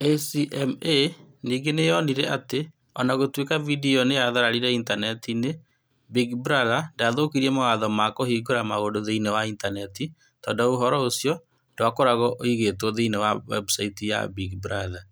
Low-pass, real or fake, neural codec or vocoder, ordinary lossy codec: none; real; none; none